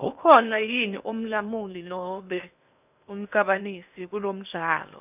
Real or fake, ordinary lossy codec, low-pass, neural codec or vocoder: fake; none; 3.6 kHz; codec, 16 kHz in and 24 kHz out, 0.8 kbps, FocalCodec, streaming, 65536 codes